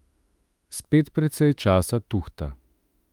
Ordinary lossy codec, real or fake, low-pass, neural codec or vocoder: Opus, 32 kbps; fake; 19.8 kHz; autoencoder, 48 kHz, 32 numbers a frame, DAC-VAE, trained on Japanese speech